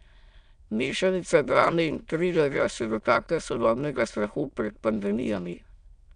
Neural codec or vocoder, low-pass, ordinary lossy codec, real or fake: autoencoder, 22.05 kHz, a latent of 192 numbers a frame, VITS, trained on many speakers; 9.9 kHz; none; fake